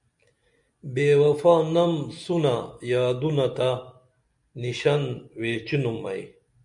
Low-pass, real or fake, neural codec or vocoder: 10.8 kHz; real; none